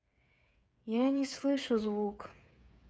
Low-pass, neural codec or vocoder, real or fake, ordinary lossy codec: none; codec, 16 kHz, 8 kbps, FreqCodec, smaller model; fake; none